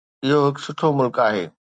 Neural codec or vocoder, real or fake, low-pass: none; real; 9.9 kHz